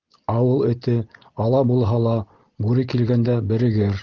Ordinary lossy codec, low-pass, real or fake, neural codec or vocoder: Opus, 16 kbps; 7.2 kHz; real; none